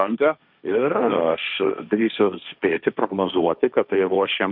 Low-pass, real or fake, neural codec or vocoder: 5.4 kHz; fake; codec, 16 kHz, 1.1 kbps, Voila-Tokenizer